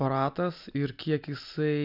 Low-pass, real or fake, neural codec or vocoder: 5.4 kHz; real; none